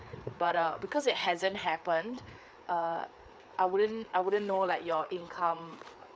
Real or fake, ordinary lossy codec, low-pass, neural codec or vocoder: fake; none; none; codec, 16 kHz, 4 kbps, FreqCodec, larger model